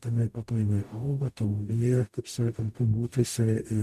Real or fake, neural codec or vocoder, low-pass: fake; codec, 44.1 kHz, 0.9 kbps, DAC; 14.4 kHz